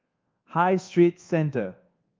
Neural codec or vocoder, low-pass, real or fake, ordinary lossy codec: codec, 24 kHz, 1.2 kbps, DualCodec; 7.2 kHz; fake; Opus, 32 kbps